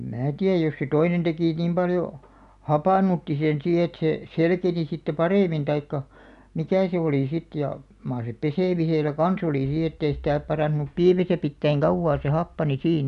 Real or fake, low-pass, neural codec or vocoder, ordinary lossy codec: real; 10.8 kHz; none; MP3, 96 kbps